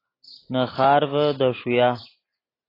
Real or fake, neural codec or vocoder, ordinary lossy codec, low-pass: real; none; AAC, 24 kbps; 5.4 kHz